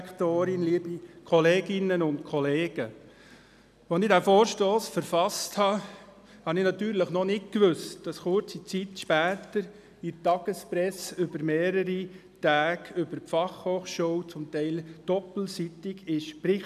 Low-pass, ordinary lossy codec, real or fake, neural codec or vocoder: 14.4 kHz; none; real; none